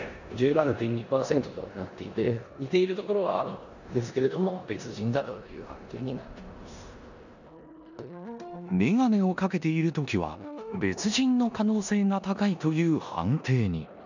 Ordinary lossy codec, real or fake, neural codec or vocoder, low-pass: none; fake; codec, 16 kHz in and 24 kHz out, 0.9 kbps, LongCat-Audio-Codec, four codebook decoder; 7.2 kHz